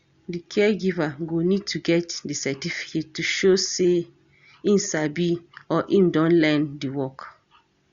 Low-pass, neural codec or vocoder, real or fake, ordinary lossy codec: 7.2 kHz; none; real; Opus, 64 kbps